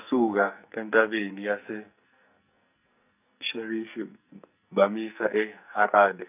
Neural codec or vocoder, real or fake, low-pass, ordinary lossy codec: codec, 44.1 kHz, 2.6 kbps, SNAC; fake; 3.6 kHz; none